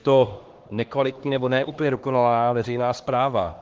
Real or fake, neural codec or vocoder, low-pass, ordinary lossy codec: fake; codec, 16 kHz, 2 kbps, X-Codec, HuBERT features, trained on LibriSpeech; 7.2 kHz; Opus, 16 kbps